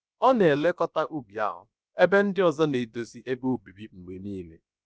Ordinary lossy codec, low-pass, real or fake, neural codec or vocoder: none; none; fake; codec, 16 kHz, about 1 kbps, DyCAST, with the encoder's durations